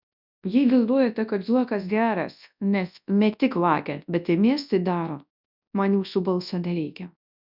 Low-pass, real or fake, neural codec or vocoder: 5.4 kHz; fake; codec, 24 kHz, 0.9 kbps, WavTokenizer, large speech release